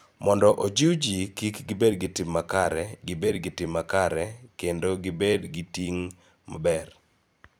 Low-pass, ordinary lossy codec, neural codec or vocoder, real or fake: none; none; vocoder, 44.1 kHz, 128 mel bands every 256 samples, BigVGAN v2; fake